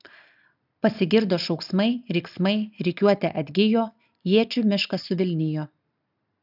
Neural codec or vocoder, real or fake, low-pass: none; real; 5.4 kHz